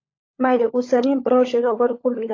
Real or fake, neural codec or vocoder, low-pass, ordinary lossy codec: fake; codec, 16 kHz, 16 kbps, FunCodec, trained on LibriTTS, 50 frames a second; 7.2 kHz; AAC, 32 kbps